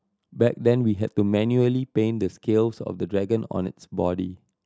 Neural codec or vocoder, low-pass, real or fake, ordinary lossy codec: none; none; real; none